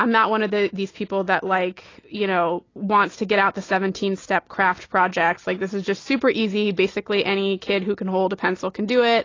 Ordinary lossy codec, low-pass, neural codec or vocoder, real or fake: AAC, 32 kbps; 7.2 kHz; none; real